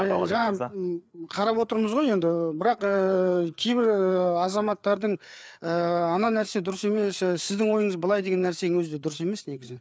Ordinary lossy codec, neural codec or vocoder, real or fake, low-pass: none; codec, 16 kHz, 4 kbps, FreqCodec, larger model; fake; none